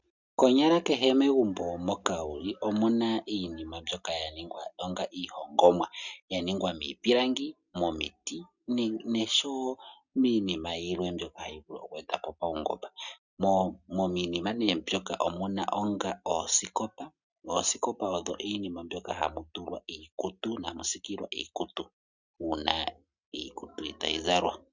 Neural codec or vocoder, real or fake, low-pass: none; real; 7.2 kHz